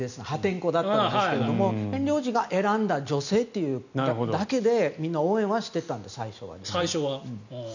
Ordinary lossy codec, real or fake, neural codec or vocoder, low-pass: none; real; none; 7.2 kHz